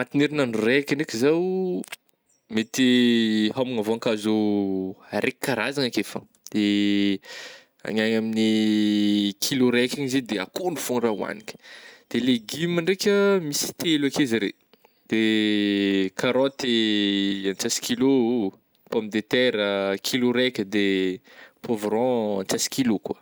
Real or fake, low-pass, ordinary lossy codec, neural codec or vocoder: real; none; none; none